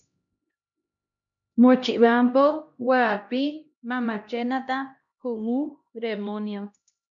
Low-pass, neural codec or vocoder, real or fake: 7.2 kHz; codec, 16 kHz, 1 kbps, X-Codec, HuBERT features, trained on LibriSpeech; fake